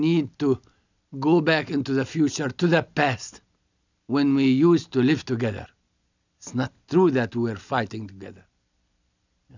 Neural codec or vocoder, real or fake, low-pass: none; real; 7.2 kHz